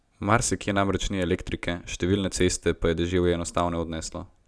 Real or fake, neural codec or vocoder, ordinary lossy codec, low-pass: real; none; none; none